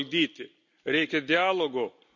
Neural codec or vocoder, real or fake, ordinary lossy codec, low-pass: none; real; none; 7.2 kHz